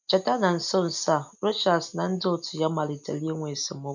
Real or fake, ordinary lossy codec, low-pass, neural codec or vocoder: real; none; 7.2 kHz; none